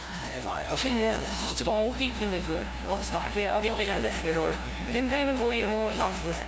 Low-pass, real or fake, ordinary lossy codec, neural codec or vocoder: none; fake; none; codec, 16 kHz, 0.5 kbps, FunCodec, trained on LibriTTS, 25 frames a second